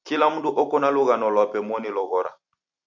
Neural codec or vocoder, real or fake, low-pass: vocoder, 44.1 kHz, 128 mel bands every 256 samples, BigVGAN v2; fake; 7.2 kHz